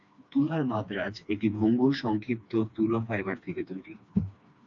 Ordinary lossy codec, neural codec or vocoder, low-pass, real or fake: AAC, 64 kbps; codec, 16 kHz, 2 kbps, FreqCodec, smaller model; 7.2 kHz; fake